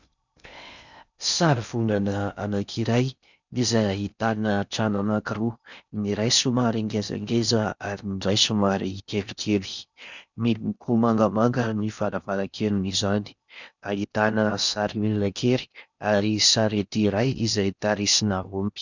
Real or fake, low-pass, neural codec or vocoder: fake; 7.2 kHz; codec, 16 kHz in and 24 kHz out, 0.6 kbps, FocalCodec, streaming, 2048 codes